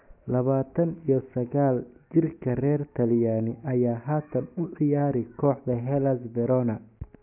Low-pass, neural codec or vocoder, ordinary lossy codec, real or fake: 3.6 kHz; none; AAC, 32 kbps; real